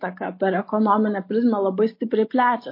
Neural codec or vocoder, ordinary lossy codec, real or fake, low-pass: none; MP3, 32 kbps; real; 5.4 kHz